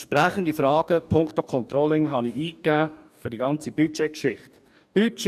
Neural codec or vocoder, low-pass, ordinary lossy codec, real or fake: codec, 44.1 kHz, 2.6 kbps, DAC; 14.4 kHz; none; fake